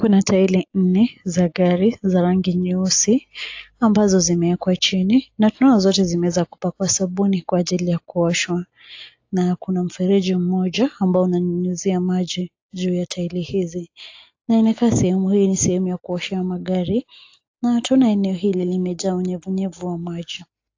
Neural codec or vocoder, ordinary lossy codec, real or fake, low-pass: none; AAC, 48 kbps; real; 7.2 kHz